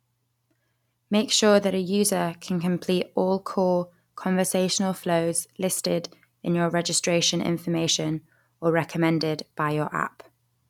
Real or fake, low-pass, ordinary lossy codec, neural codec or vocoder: real; 19.8 kHz; none; none